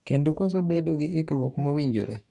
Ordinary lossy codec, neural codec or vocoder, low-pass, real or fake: none; codec, 44.1 kHz, 2.6 kbps, DAC; 10.8 kHz; fake